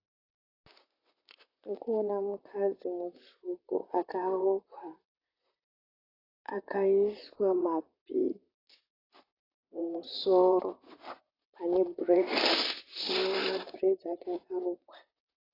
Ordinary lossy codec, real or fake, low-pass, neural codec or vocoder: AAC, 24 kbps; fake; 5.4 kHz; vocoder, 44.1 kHz, 128 mel bands, Pupu-Vocoder